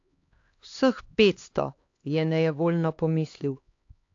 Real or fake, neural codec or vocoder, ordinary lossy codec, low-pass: fake; codec, 16 kHz, 2 kbps, X-Codec, HuBERT features, trained on LibriSpeech; AAC, 48 kbps; 7.2 kHz